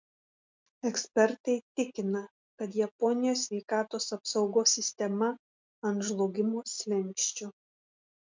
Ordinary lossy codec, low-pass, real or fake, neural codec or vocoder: MP3, 64 kbps; 7.2 kHz; real; none